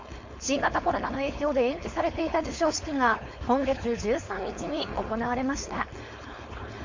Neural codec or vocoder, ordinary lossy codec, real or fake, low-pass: codec, 16 kHz, 4.8 kbps, FACodec; MP3, 48 kbps; fake; 7.2 kHz